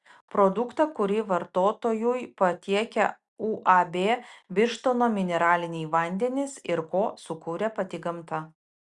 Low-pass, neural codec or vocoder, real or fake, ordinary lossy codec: 10.8 kHz; none; real; Opus, 64 kbps